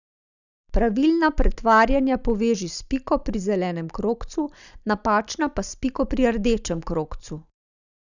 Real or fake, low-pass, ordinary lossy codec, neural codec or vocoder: real; 7.2 kHz; none; none